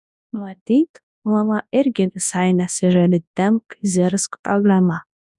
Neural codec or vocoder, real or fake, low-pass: codec, 24 kHz, 0.9 kbps, WavTokenizer, large speech release; fake; 10.8 kHz